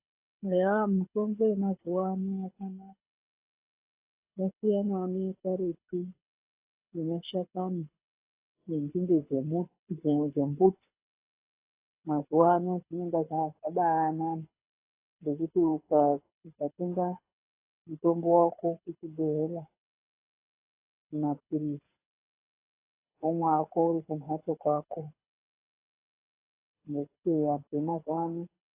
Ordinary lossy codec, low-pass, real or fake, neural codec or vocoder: AAC, 24 kbps; 3.6 kHz; fake; codec, 24 kHz, 6 kbps, HILCodec